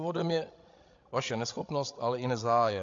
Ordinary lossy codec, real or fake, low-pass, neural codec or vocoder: AAC, 48 kbps; fake; 7.2 kHz; codec, 16 kHz, 16 kbps, FreqCodec, larger model